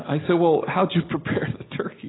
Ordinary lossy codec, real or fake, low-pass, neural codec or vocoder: AAC, 16 kbps; real; 7.2 kHz; none